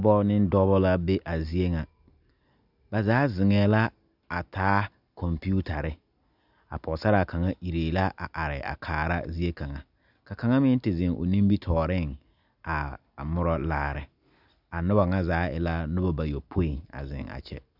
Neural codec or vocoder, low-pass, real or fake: none; 5.4 kHz; real